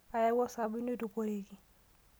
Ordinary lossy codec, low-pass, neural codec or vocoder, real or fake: none; none; none; real